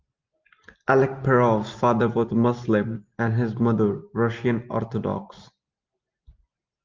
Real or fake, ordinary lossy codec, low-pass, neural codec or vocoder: real; Opus, 32 kbps; 7.2 kHz; none